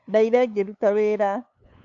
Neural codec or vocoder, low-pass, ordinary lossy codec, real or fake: codec, 16 kHz, 8 kbps, FunCodec, trained on LibriTTS, 25 frames a second; 7.2 kHz; MP3, 64 kbps; fake